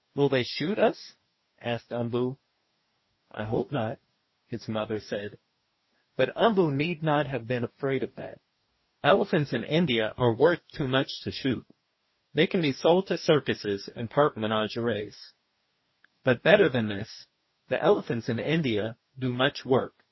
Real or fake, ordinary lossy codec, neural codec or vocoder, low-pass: fake; MP3, 24 kbps; codec, 44.1 kHz, 2.6 kbps, DAC; 7.2 kHz